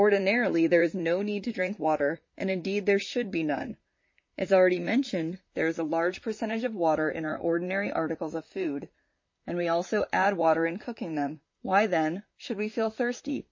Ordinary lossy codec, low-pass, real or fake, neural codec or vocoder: MP3, 32 kbps; 7.2 kHz; fake; vocoder, 44.1 kHz, 128 mel bands, Pupu-Vocoder